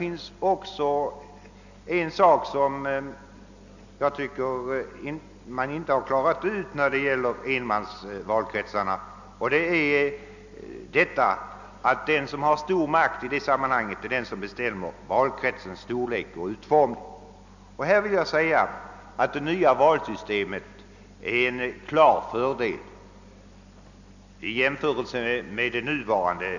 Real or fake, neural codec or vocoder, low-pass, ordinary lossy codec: real; none; 7.2 kHz; none